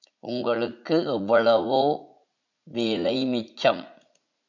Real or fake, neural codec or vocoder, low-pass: fake; vocoder, 44.1 kHz, 80 mel bands, Vocos; 7.2 kHz